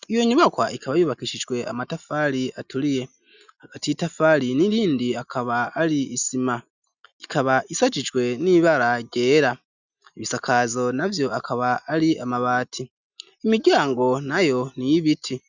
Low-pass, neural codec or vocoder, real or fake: 7.2 kHz; none; real